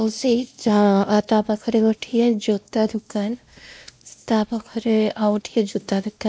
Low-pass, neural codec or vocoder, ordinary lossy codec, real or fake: none; codec, 16 kHz, 1 kbps, X-Codec, WavLM features, trained on Multilingual LibriSpeech; none; fake